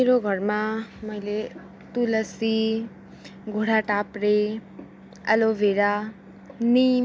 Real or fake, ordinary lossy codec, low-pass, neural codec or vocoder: real; none; none; none